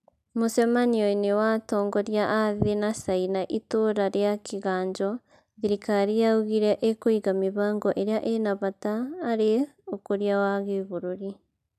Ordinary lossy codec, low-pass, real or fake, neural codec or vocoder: none; 14.4 kHz; real; none